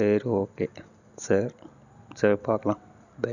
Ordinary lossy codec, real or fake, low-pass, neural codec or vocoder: none; real; 7.2 kHz; none